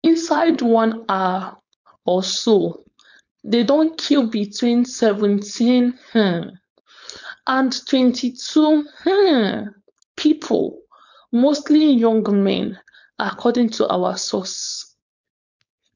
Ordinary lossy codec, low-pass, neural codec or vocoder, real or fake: none; 7.2 kHz; codec, 16 kHz, 4.8 kbps, FACodec; fake